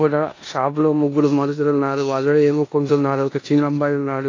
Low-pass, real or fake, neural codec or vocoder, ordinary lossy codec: 7.2 kHz; fake; codec, 16 kHz in and 24 kHz out, 0.9 kbps, LongCat-Audio-Codec, four codebook decoder; AAC, 32 kbps